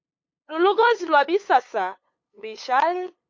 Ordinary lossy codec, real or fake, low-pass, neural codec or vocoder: MP3, 48 kbps; fake; 7.2 kHz; codec, 16 kHz, 8 kbps, FunCodec, trained on LibriTTS, 25 frames a second